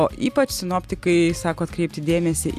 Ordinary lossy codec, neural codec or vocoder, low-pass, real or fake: AAC, 96 kbps; none; 14.4 kHz; real